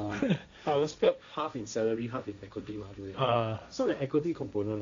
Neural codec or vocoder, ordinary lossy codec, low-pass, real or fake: codec, 16 kHz, 1.1 kbps, Voila-Tokenizer; MP3, 64 kbps; 7.2 kHz; fake